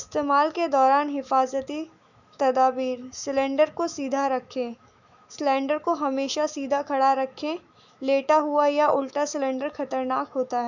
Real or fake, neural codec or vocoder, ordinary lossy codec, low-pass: fake; autoencoder, 48 kHz, 128 numbers a frame, DAC-VAE, trained on Japanese speech; none; 7.2 kHz